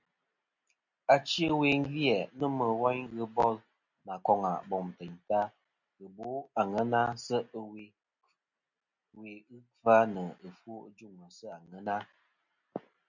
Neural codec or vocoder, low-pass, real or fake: none; 7.2 kHz; real